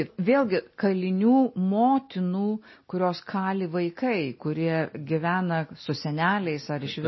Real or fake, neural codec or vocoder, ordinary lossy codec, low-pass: real; none; MP3, 24 kbps; 7.2 kHz